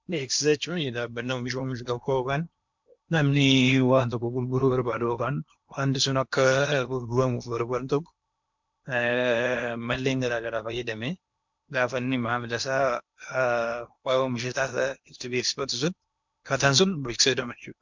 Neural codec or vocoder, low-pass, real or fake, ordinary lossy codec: codec, 16 kHz in and 24 kHz out, 0.8 kbps, FocalCodec, streaming, 65536 codes; 7.2 kHz; fake; MP3, 64 kbps